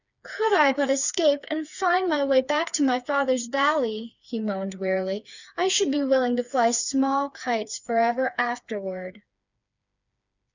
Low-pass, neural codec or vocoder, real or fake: 7.2 kHz; codec, 16 kHz, 4 kbps, FreqCodec, smaller model; fake